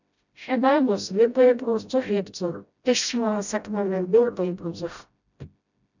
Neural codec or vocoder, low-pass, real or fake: codec, 16 kHz, 0.5 kbps, FreqCodec, smaller model; 7.2 kHz; fake